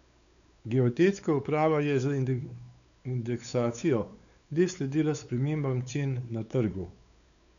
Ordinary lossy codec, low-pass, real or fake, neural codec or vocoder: MP3, 96 kbps; 7.2 kHz; fake; codec, 16 kHz, 4 kbps, X-Codec, WavLM features, trained on Multilingual LibriSpeech